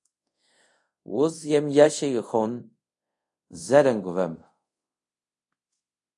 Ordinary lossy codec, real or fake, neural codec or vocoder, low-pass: AAC, 64 kbps; fake; codec, 24 kHz, 0.5 kbps, DualCodec; 10.8 kHz